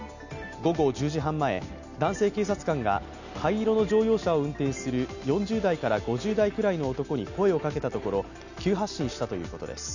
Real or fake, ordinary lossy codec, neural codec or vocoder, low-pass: real; none; none; 7.2 kHz